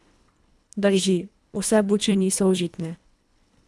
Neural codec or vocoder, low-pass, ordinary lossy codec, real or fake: codec, 24 kHz, 1.5 kbps, HILCodec; none; none; fake